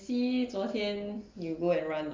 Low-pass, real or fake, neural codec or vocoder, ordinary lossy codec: 7.2 kHz; real; none; Opus, 24 kbps